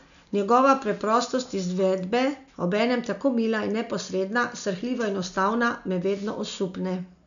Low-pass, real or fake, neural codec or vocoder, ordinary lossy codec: 7.2 kHz; real; none; none